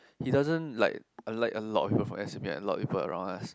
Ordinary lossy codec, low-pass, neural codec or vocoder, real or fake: none; none; none; real